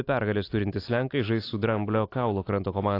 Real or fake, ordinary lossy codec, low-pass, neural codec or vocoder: real; AAC, 32 kbps; 5.4 kHz; none